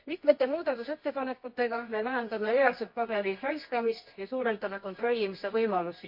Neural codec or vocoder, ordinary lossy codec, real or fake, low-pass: codec, 24 kHz, 0.9 kbps, WavTokenizer, medium music audio release; MP3, 32 kbps; fake; 5.4 kHz